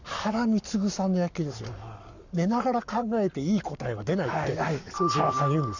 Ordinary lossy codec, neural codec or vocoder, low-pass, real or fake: none; codec, 44.1 kHz, 7.8 kbps, Pupu-Codec; 7.2 kHz; fake